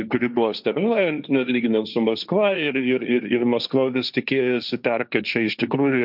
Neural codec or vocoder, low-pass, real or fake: codec, 16 kHz, 1.1 kbps, Voila-Tokenizer; 5.4 kHz; fake